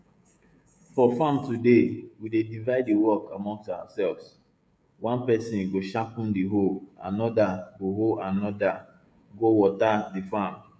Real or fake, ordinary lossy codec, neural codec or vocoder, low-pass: fake; none; codec, 16 kHz, 16 kbps, FreqCodec, smaller model; none